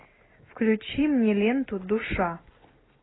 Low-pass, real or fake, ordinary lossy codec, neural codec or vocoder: 7.2 kHz; real; AAC, 16 kbps; none